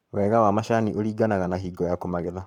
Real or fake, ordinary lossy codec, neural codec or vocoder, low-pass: fake; none; codec, 44.1 kHz, 7.8 kbps, Pupu-Codec; 19.8 kHz